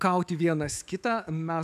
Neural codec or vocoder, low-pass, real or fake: autoencoder, 48 kHz, 128 numbers a frame, DAC-VAE, trained on Japanese speech; 14.4 kHz; fake